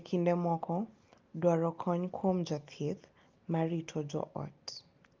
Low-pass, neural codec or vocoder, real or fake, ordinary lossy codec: 7.2 kHz; none; real; Opus, 32 kbps